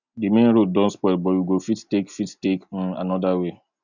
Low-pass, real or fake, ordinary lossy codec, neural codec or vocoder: 7.2 kHz; real; none; none